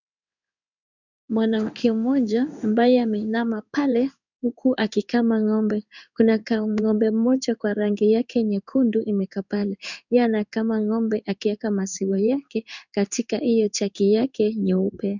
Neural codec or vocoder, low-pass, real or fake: codec, 16 kHz in and 24 kHz out, 1 kbps, XY-Tokenizer; 7.2 kHz; fake